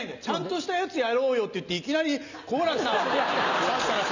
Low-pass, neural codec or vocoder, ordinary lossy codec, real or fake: 7.2 kHz; none; none; real